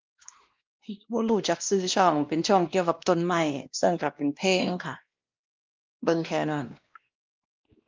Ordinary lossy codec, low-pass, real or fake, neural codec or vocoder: Opus, 24 kbps; 7.2 kHz; fake; codec, 16 kHz, 1 kbps, X-Codec, WavLM features, trained on Multilingual LibriSpeech